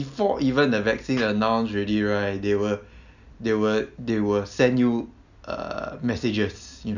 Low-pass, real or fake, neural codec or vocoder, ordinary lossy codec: 7.2 kHz; real; none; none